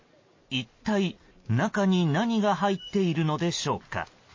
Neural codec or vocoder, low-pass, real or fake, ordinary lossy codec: none; 7.2 kHz; real; MP3, 32 kbps